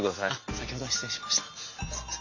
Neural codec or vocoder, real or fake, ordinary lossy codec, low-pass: none; real; AAC, 48 kbps; 7.2 kHz